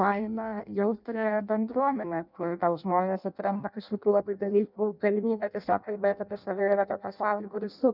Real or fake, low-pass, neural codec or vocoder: fake; 5.4 kHz; codec, 16 kHz in and 24 kHz out, 0.6 kbps, FireRedTTS-2 codec